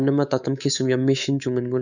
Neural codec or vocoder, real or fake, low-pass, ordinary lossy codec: codec, 24 kHz, 3.1 kbps, DualCodec; fake; 7.2 kHz; none